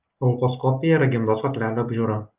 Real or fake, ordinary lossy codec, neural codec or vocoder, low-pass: real; Opus, 32 kbps; none; 3.6 kHz